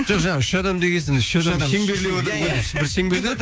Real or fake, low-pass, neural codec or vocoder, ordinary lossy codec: fake; none; codec, 16 kHz, 6 kbps, DAC; none